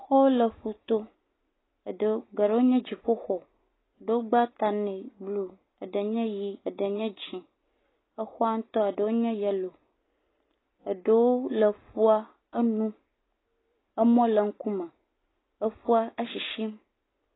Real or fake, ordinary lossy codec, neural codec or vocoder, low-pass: real; AAC, 16 kbps; none; 7.2 kHz